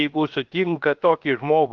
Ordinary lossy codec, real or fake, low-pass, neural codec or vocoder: Opus, 24 kbps; fake; 7.2 kHz; codec, 16 kHz, 0.7 kbps, FocalCodec